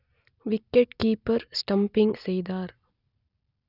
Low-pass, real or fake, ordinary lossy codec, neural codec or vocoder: 5.4 kHz; real; none; none